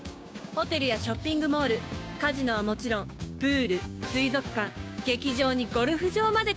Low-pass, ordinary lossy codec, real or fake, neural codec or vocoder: none; none; fake; codec, 16 kHz, 6 kbps, DAC